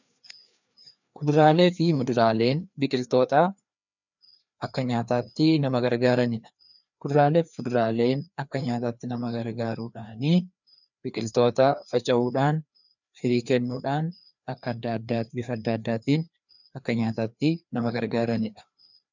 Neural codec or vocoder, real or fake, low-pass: codec, 16 kHz, 2 kbps, FreqCodec, larger model; fake; 7.2 kHz